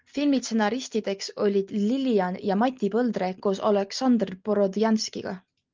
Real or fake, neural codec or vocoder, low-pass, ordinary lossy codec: real; none; 7.2 kHz; Opus, 24 kbps